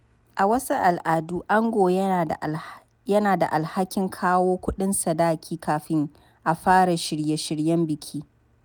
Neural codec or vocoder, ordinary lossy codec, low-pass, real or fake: none; none; none; real